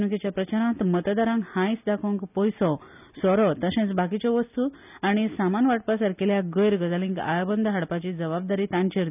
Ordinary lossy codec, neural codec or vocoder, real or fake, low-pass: none; none; real; 3.6 kHz